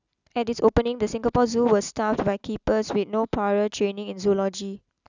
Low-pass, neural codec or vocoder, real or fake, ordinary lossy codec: 7.2 kHz; none; real; none